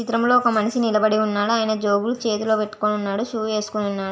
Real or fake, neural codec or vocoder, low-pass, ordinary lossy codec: real; none; none; none